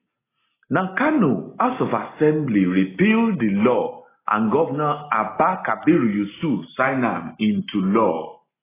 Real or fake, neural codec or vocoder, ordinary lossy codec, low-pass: real; none; AAC, 16 kbps; 3.6 kHz